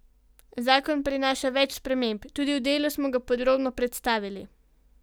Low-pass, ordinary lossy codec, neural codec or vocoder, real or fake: none; none; none; real